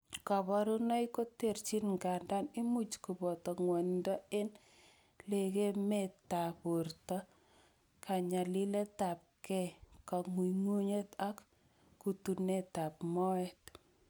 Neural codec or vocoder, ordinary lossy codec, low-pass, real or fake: none; none; none; real